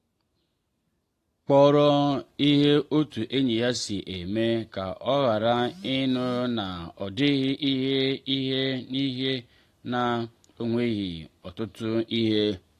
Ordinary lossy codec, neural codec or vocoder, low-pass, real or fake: AAC, 48 kbps; none; 14.4 kHz; real